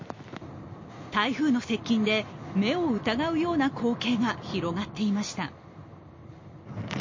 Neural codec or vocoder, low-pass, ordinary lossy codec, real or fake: none; 7.2 kHz; MP3, 32 kbps; real